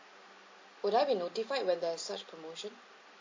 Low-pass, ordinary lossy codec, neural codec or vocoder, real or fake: 7.2 kHz; MP3, 32 kbps; none; real